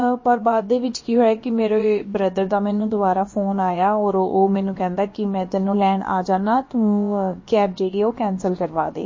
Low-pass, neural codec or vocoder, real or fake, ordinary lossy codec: 7.2 kHz; codec, 16 kHz, about 1 kbps, DyCAST, with the encoder's durations; fake; MP3, 32 kbps